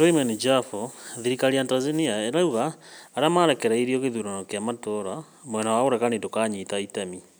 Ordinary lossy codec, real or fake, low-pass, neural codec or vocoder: none; real; none; none